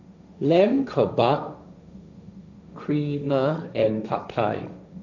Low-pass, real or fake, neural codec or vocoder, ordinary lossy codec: 7.2 kHz; fake; codec, 16 kHz, 1.1 kbps, Voila-Tokenizer; none